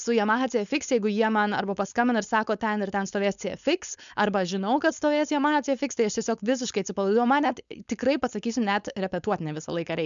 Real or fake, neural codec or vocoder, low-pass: fake; codec, 16 kHz, 4.8 kbps, FACodec; 7.2 kHz